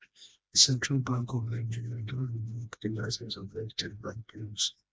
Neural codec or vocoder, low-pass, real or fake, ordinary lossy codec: codec, 16 kHz, 1 kbps, FreqCodec, smaller model; none; fake; none